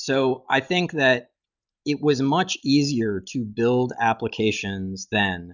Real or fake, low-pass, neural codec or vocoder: real; 7.2 kHz; none